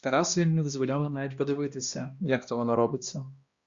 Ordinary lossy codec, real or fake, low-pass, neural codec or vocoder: Opus, 64 kbps; fake; 7.2 kHz; codec, 16 kHz, 1 kbps, X-Codec, HuBERT features, trained on balanced general audio